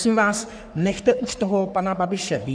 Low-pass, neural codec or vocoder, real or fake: 9.9 kHz; codec, 44.1 kHz, 3.4 kbps, Pupu-Codec; fake